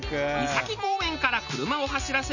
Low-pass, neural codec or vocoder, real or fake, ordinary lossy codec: 7.2 kHz; none; real; none